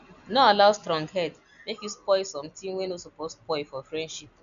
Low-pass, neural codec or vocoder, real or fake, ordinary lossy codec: 7.2 kHz; none; real; none